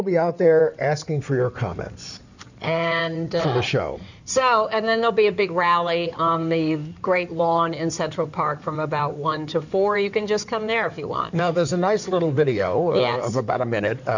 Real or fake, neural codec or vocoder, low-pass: fake; codec, 16 kHz in and 24 kHz out, 2.2 kbps, FireRedTTS-2 codec; 7.2 kHz